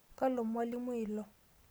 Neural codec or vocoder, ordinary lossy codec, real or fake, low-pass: none; none; real; none